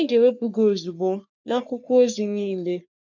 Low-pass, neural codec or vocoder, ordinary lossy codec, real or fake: 7.2 kHz; codec, 44.1 kHz, 3.4 kbps, Pupu-Codec; none; fake